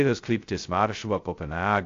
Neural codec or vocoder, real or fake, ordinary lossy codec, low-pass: codec, 16 kHz, 0.2 kbps, FocalCodec; fake; AAC, 48 kbps; 7.2 kHz